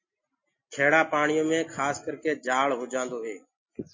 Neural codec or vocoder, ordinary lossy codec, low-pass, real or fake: none; MP3, 32 kbps; 7.2 kHz; real